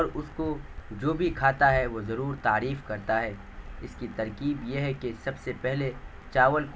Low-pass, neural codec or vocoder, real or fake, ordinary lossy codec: none; none; real; none